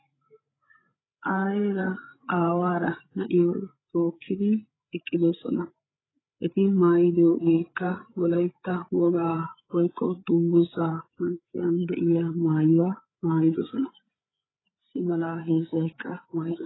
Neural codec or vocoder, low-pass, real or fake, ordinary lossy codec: codec, 16 kHz, 8 kbps, FreqCodec, larger model; 7.2 kHz; fake; AAC, 16 kbps